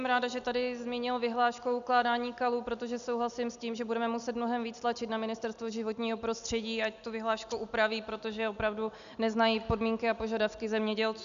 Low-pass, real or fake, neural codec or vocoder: 7.2 kHz; real; none